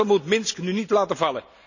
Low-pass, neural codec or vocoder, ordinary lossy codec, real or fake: 7.2 kHz; none; none; real